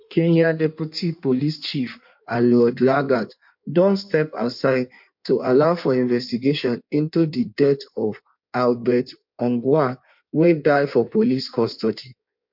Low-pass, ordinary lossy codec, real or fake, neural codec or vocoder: 5.4 kHz; MP3, 48 kbps; fake; codec, 16 kHz in and 24 kHz out, 1.1 kbps, FireRedTTS-2 codec